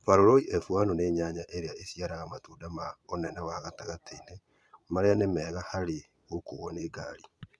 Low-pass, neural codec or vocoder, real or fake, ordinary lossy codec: none; vocoder, 22.05 kHz, 80 mel bands, Vocos; fake; none